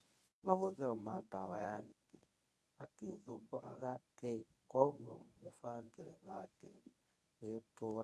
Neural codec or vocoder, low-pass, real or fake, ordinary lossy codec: codec, 24 kHz, 0.9 kbps, WavTokenizer, medium speech release version 1; none; fake; none